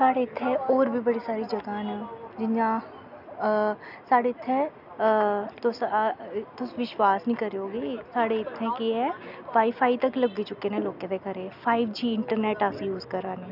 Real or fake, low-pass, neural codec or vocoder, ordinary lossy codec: real; 5.4 kHz; none; none